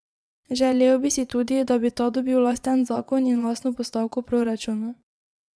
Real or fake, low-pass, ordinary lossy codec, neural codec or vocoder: fake; none; none; vocoder, 22.05 kHz, 80 mel bands, Vocos